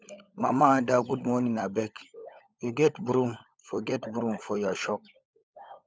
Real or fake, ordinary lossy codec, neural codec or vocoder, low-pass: fake; none; codec, 16 kHz, 16 kbps, FunCodec, trained on LibriTTS, 50 frames a second; none